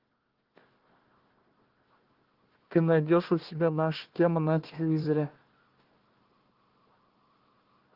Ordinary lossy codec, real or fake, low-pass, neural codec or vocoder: Opus, 16 kbps; fake; 5.4 kHz; codec, 16 kHz, 1 kbps, FunCodec, trained on Chinese and English, 50 frames a second